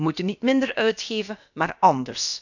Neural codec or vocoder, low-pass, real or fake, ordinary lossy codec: codec, 16 kHz, about 1 kbps, DyCAST, with the encoder's durations; 7.2 kHz; fake; none